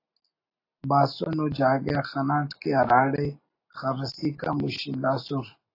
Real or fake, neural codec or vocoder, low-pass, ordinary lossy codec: real; none; 5.4 kHz; AAC, 32 kbps